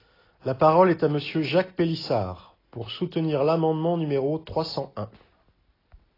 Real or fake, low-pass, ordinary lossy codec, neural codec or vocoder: real; 5.4 kHz; AAC, 24 kbps; none